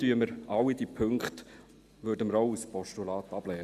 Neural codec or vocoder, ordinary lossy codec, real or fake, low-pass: none; Opus, 64 kbps; real; 14.4 kHz